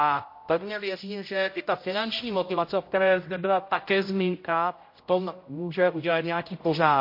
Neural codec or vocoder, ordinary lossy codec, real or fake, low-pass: codec, 16 kHz, 0.5 kbps, X-Codec, HuBERT features, trained on general audio; MP3, 32 kbps; fake; 5.4 kHz